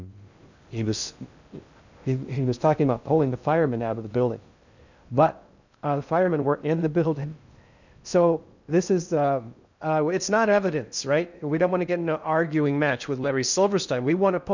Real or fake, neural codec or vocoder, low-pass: fake; codec, 16 kHz in and 24 kHz out, 0.6 kbps, FocalCodec, streaming, 2048 codes; 7.2 kHz